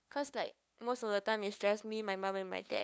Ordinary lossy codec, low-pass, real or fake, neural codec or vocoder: none; none; fake; codec, 16 kHz, 2 kbps, FunCodec, trained on LibriTTS, 25 frames a second